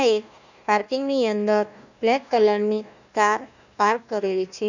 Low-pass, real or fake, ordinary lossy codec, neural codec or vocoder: 7.2 kHz; fake; none; codec, 16 kHz, 1 kbps, FunCodec, trained on Chinese and English, 50 frames a second